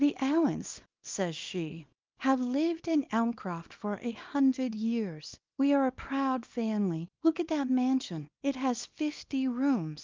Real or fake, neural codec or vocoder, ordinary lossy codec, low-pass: fake; codec, 24 kHz, 0.9 kbps, WavTokenizer, small release; Opus, 32 kbps; 7.2 kHz